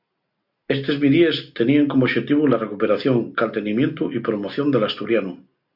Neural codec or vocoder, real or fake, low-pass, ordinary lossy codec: none; real; 5.4 kHz; AAC, 48 kbps